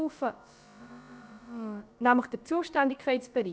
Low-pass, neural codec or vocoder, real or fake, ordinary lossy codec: none; codec, 16 kHz, about 1 kbps, DyCAST, with the encoder's durations; fake; none